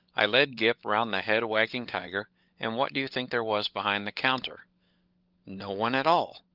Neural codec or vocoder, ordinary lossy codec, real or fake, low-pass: codec, 16 kHz, 16 kbps, FunCodec, trained on LibriTTS, 50 frames a second; Opus, 32 kbps; fake; 5.4 kHz